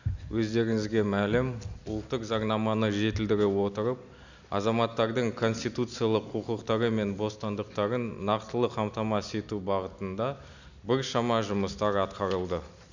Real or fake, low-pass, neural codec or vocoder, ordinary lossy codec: real; 7.2 kHz; none; none